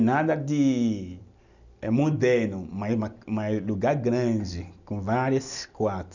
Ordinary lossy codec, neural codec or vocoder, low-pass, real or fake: none; none; 7.2 kHz; real